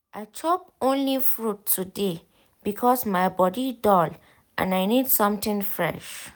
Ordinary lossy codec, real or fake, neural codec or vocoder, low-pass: none; real; none; none